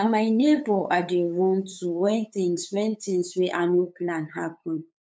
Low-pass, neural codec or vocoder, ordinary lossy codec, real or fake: none; codec, 16 kHz, 8 kbps, FunCodec, trained on LibriTTS, 25 frames a second; none; fake